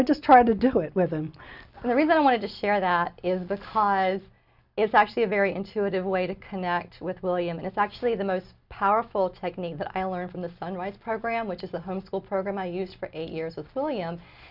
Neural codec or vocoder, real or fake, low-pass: none; real; 5.4 kHz